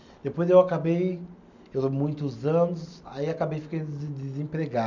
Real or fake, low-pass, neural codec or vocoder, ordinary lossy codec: real; 7.2 kHz; none; none